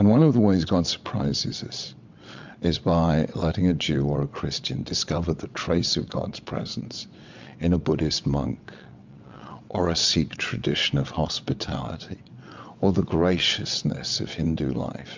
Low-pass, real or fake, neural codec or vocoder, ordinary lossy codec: 7.2 kHz; fake; vocoder, 22.05 kHz, 80 mel bands, WaveNeXt; MP3, 64 kbps